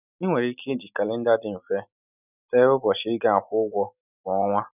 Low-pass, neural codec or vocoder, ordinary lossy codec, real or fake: 3.6 kHz; none; none; real